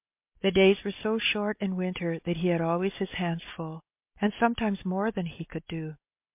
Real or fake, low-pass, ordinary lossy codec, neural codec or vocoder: real; 3.6 kHz; MP3, 32 kbps; none